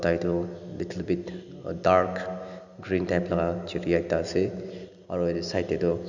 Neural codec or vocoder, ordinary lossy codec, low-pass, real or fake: none; none; 7.2 kHz; real